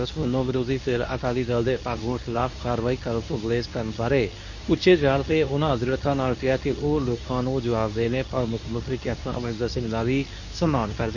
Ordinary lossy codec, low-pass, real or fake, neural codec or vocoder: none; 7.2 kHz; fake; codec, 24 kHz, 0.9 kbps, WavTokenizer, medium speech release version 2